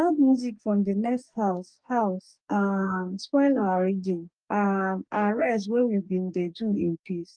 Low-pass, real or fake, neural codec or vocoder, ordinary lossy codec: 9.9 kHz; fake; codec, 44.1 kHz, 2.6 kbps, DAC; Opus, 32 kbps